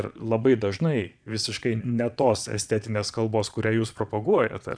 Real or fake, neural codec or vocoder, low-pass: fake; vocoder, 22.05 kHz, 80 mel bands, Vocos; 9.9 kHz